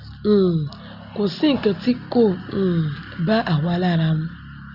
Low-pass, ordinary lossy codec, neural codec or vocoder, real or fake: 5.4 kHz; Opus, 64 kbps; none; real